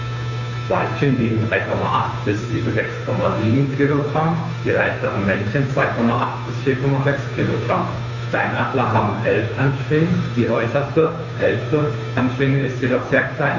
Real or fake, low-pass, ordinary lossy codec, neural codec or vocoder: fake; 7.2 kHz; none; codec, 16 kHz, 2 kbps, FunCodec, trained on Chinese and English, 25 frames a second